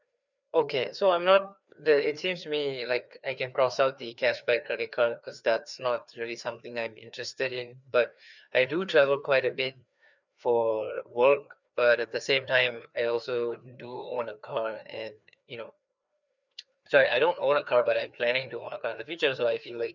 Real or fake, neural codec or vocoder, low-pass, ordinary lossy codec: fake; codec, 16 kHz, 2 kbps, FreqCodec, larger model; 7.2 kHz; none